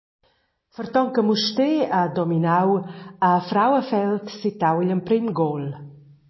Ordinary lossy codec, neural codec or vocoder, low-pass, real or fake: MP3, 24 kbps; none; 7.2 kHz; real